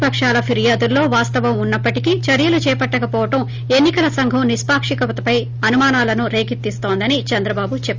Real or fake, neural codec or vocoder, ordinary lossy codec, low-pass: real; none; Opus, 32 kbps; 7.2 kHz